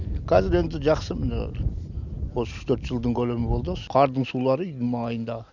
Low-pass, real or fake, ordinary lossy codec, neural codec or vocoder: 7.2 kHz; real; none; none